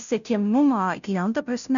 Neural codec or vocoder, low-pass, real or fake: codec, 16 kHz, 0.5 kbps, FunCodec, trained on Chinese and English, 25 frames a second; 7.2 kHz; fake